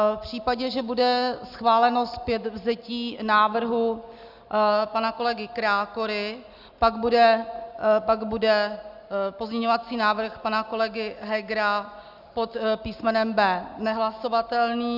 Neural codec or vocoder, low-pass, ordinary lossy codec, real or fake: none; 5.4 kHz; Opus, 64 kbps; real